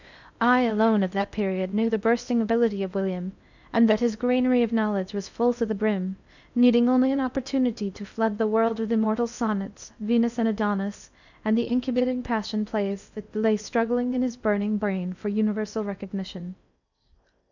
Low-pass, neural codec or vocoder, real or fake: 7.2 kHz; codec, 16 kHz in and 24 kHz out, 0.6 kbps, FocalCodec, streaming, 4096 codes; fake